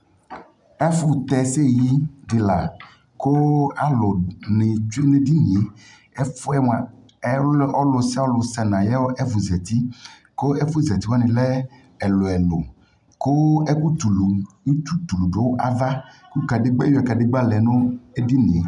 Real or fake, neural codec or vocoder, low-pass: real; none; 10.8 kHz